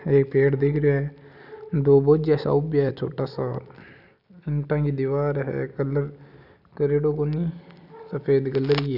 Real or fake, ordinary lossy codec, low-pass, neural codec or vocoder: real; none; 5.4 kHz; none